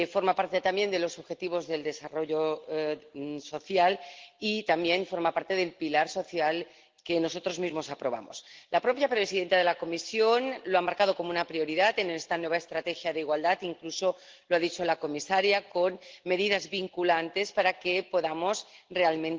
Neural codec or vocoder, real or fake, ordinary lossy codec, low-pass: none; real; Opus, 16 kbps; 7.2 kHz